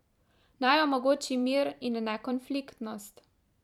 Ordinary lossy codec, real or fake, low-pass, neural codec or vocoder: none; fake; 19.8 kHz; vocoder, 44.1 kHz, 128 mel bands every 512 samples, BigVGAN v2